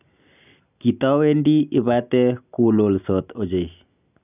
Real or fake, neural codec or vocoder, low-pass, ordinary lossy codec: real; none; 3.6 kHz; none